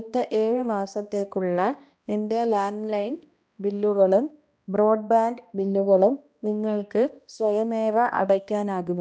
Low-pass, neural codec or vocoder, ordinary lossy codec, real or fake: none; codec, 16 kHz, 1 kbps, X-Codec, HuBERT features, trained on balanced general audio; none; fake